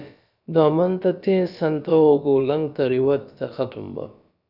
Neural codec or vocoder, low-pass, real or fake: codec, 16 kHz, about 1 kbps, DyCAST, with the encoder's durations; 5.4 kHz; fake